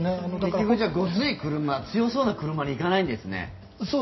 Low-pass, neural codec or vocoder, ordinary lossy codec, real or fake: 7.2 kHz; none; MP3, 24 kbps; real